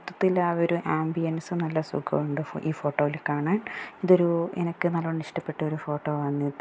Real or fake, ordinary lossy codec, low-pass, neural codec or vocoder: real; none; none; none